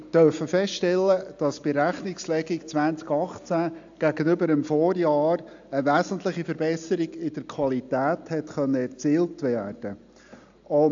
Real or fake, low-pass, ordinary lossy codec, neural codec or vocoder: real; 7.2 kHz; AAC, 64 kbps; none